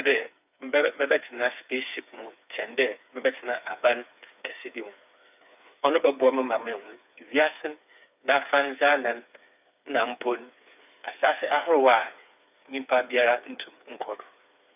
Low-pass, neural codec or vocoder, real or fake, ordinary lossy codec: 3.6 kHz; codec, 16 kHz, 4 kbps, FreqCodec, smaller model; fake; none